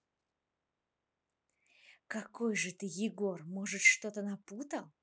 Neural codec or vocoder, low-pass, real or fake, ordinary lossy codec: none; none; real; none